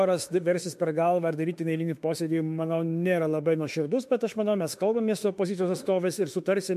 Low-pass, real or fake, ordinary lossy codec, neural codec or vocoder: 14.4 kHz; fake; MP3, 64 kbps; autoencoder, 48 kHz, 32 numbers a frame, DAC-VAE, trained on Japanese speech